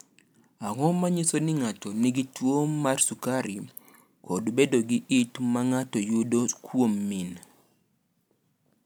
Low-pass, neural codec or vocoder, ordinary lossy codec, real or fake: none; none; none; real